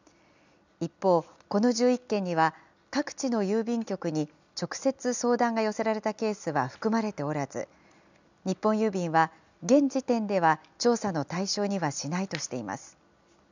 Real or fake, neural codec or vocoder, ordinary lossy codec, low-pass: real; none; none; 7.2 kHz